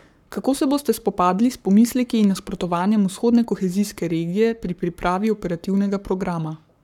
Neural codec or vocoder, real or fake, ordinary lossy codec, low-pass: codec, 44.1 kHz, 7.8 kbps, Pupu-Codec; fake; none; 19.8 kHz